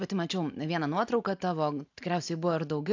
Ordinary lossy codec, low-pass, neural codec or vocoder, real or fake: AAC, 48 kbps; 7.2 kHz; none; real